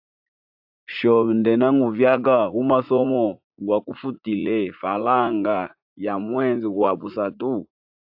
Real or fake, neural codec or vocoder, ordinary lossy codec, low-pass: fake; vocoder, 44.1 kHz, 80 mel bands, Vocos; AAC, 48 kbps; 5.4 kHz